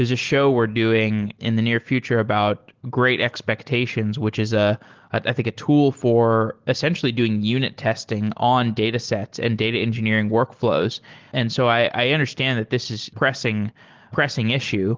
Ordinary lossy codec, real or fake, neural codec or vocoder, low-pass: Opus, 16 kbps; real; none; 7.2 kHz